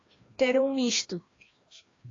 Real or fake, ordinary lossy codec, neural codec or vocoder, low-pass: fake; AAC, 32 kbps; codec, 16 kHz, 1 kbps, FreqCodec, larger model; 7.2 kHz